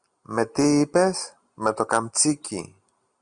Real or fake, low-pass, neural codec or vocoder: real; 9.9 kHz; none